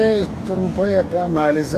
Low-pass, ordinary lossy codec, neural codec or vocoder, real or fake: 14.4 kHz; AAC, 64 kbps; codec, 44.1 kHz, 2.6 kbps, DAC; fake